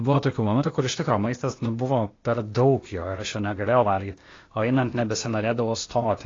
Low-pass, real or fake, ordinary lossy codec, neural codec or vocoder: 7.2 kHz; fake; AAC, 32 kbps; codec, 16 kHz, 0.8 kbps, ZipCodec